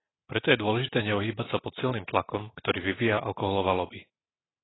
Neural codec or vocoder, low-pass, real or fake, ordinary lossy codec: none; 7.2 kHz; real; AAC, 16 kbps